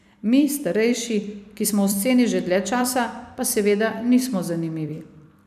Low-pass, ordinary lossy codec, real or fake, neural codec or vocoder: 14.4 kHz; none; fake; vocoder, 44.1 kHz, 128 mel bands every 256 samples, BigVGAN v2